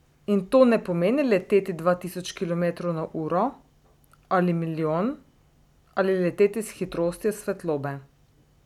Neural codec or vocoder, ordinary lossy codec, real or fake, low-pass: none; none; real; 19.8 kHz